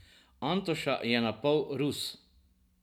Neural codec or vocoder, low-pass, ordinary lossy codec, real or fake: none; 19.8 kHz; none; real